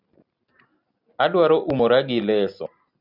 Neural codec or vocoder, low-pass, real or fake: none; 5.4 kHz; real